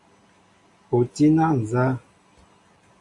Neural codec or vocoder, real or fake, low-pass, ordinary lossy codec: none; real; 10.8 kHz; MP3, 48 kbps